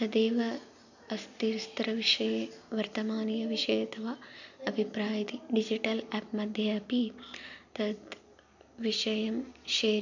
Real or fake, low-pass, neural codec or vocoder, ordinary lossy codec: real; 7.2 kHz; none; none